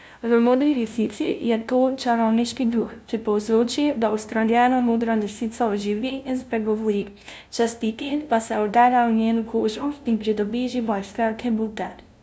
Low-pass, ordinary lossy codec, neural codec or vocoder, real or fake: none; none; codec, 16 kHz, 0.5 kbps, FunCodec, trained on LibriTTS, 25 frames a second; fake